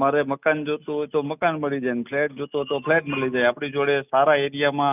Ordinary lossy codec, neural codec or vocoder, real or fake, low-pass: none; none; real; 3.6 kHz